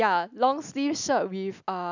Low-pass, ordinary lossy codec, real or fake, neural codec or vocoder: 7.2 kHz; none; real; none